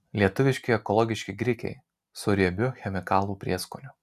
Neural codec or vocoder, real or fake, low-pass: none; real; 14.4 kHz